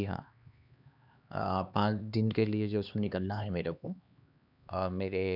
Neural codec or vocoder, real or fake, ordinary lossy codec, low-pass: codec, 16 kHz, 2 kbps, X-Codec, HuBERT features, trained on LibriSpeech; fake; none; 5.4 kHz